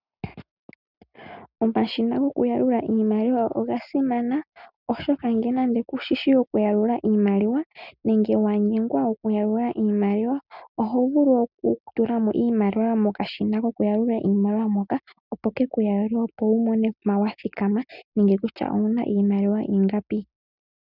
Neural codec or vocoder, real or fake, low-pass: none; real; 5.4 kHz